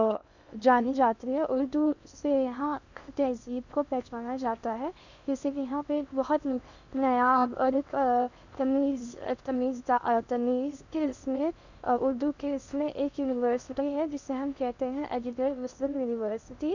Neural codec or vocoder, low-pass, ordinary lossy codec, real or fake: codec, 16 kHz in and 24 kHz out, 0.6 kbps, FocalCodec, streaming, 2048 codes; 7.2 kHz; none; fake